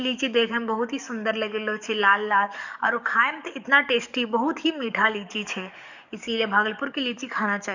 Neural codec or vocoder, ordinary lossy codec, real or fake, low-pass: codec, 44.1 kHz, 7.8 kbps, DAC; none; fake; 7.2 kHz